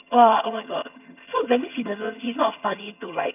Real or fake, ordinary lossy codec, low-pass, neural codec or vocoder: fake; none; 3.6 kHz; vocoder, 22.05 kHz, 80 mel bands, HiFi-GAN